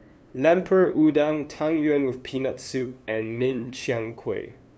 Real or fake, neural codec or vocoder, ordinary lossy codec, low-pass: fake; codec, 16 kHz, 2 kbps, FunCodec, trained on LibriTTS, 25 frames a second; none; none